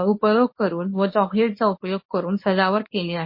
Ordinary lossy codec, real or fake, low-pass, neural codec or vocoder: MP3, 24 kbps; fake; 5.4 kHz; codec, 24 kHz, 0.9 kbps, WavTokenizer, medium speech release version 1